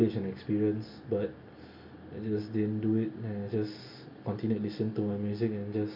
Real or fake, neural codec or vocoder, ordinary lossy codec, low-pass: real; none; MP3, 48 kbps; 5.4 kHz